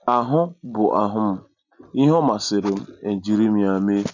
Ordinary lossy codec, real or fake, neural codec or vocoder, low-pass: none; real; none; 7.2 kHz